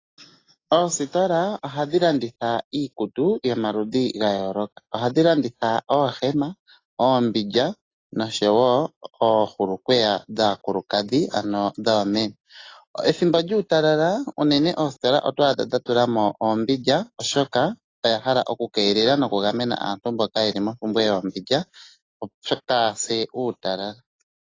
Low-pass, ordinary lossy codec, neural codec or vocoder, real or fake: 7.2 kHz; AAC, 32 kbps; none; real